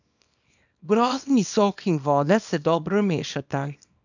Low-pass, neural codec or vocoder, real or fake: 7.2 kHz; codec, 24 kHz, 0.9 kbps, WavTokenizer, small release; fake